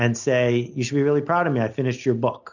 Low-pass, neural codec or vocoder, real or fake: 7.2 kHz; none; real